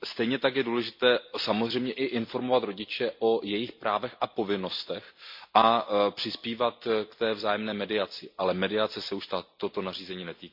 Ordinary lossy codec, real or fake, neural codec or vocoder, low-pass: MP3, 48 kbps; real; none; 5.4 kHz